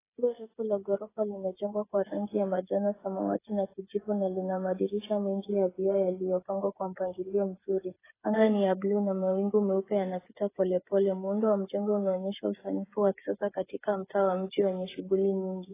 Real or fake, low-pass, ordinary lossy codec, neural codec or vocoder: fake; 3.6 kHz; AAC, 16 kbps; codec, 16 kHz, 8 kbps, FreqCodec, smaller model